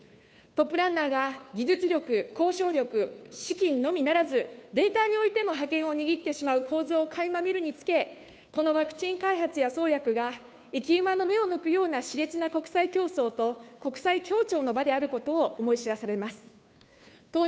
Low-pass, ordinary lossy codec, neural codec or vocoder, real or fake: none; none; codec, 16 kHz, 2 kbps, FunCodec, trained on Chinese and English, 25 frames a second; fake